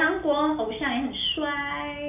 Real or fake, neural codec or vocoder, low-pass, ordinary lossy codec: real; none; 3.6 kHz; MP3, 32 kbps